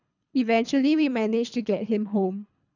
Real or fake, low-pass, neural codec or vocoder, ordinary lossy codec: fake; 7.2 kHz; codec, 24 kHz, 3 kbps, HILCodec; none